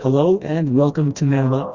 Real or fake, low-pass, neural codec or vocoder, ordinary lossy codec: fake; 7.2 kHz; codec, 16 kHz, 1 kbps, FreqCodec, smaller model; Opus, 64 kbps